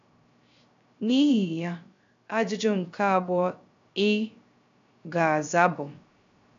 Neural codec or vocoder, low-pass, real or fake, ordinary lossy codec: codec, 16 kHz, 0.3 kbps, FocalCodec; 7.2 kHz; fake; AAC, 64 kbps